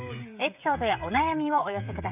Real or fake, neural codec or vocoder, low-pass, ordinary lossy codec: fake; codec, 16 kHz, 16 kbps, FreqCodec, smaller model; 3.6 kHz; none